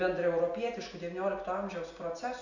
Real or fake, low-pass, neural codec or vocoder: real; 7.2 kHz; none